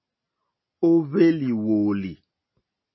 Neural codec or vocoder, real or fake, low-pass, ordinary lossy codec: none; real; 7.2 kHz; MP3, 24 kbps